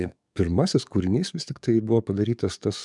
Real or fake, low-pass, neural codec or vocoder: fake; 10.8 kHz; codec, 44.1 kHz, 7.8 kbps, DAC